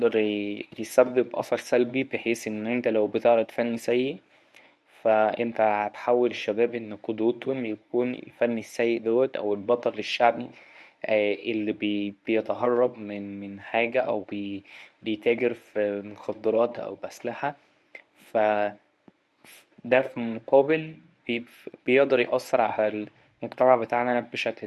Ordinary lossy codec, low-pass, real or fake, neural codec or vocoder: none; none; fake; codec, 24 kHz, 0.9 kbps, WavTokenizer, medium speech release version 1